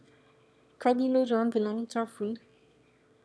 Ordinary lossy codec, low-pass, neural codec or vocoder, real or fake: none; none; autoencoder, 22.05 kHz, a latent of 192 numbers a frame, VITS, trained on one speaker; fake